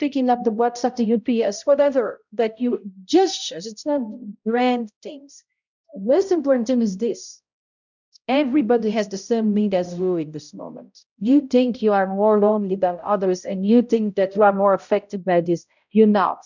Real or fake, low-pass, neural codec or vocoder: fake; 7.2 kHz; codec, 16 kHz, 0.5 kbps, X-Codec, HuBERT features, trained on balanced general audio